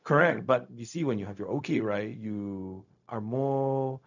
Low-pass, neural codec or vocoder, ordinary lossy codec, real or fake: 7.2 kHz; codec, 16 kHz, 0.4 kbps, LongCat-Audio-Codec; none; fake